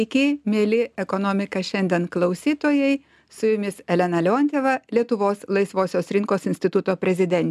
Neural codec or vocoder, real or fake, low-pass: none; real; 14.4 kHz